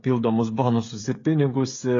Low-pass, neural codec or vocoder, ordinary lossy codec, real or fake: 7.2 kHz; codec, 16 kHz, 16 kbps, FreqCodec, smaller model; AAC, 32 kbps; fake